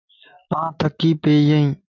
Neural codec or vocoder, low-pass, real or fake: none; 7.2 kHz; real